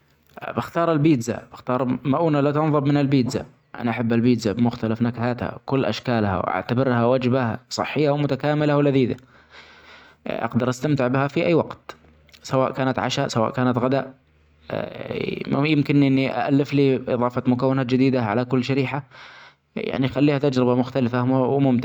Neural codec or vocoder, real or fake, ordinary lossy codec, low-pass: none; real; none; 19.8 kHz